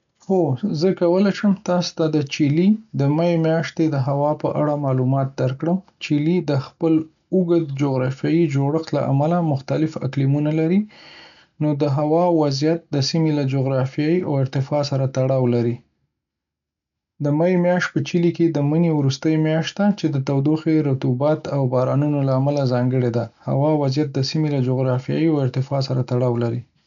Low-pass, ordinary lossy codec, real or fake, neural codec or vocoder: 7.2 kHz; none; real; none